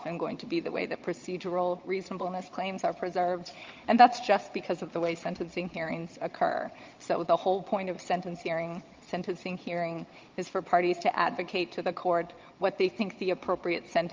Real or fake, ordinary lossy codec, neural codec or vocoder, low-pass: fake; Opus, 24 kbps; vocoder, 22.05 kHz, 80 mel bands, Vocos; 7.2 kHz